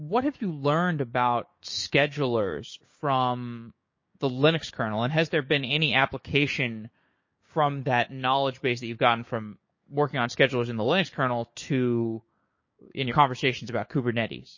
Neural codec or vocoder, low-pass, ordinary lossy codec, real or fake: codec, 16 kHz, 6 kbps, DAC; 7.2 kHz; MP3, 32 kbps; fake